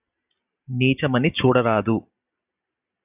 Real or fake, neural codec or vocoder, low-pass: real; none; 3.6 kHz